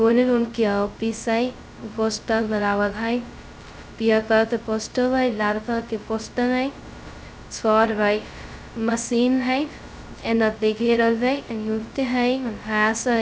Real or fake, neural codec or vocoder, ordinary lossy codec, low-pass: fake; codec, 16 kHz, 0.2 kbps, FocalCodec; none; none